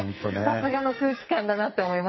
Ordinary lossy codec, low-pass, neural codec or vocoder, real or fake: MP3, 24 kbps; 7.2 kHz; codec, 44.1 kHz, 2.6 kbps, SNAC; fake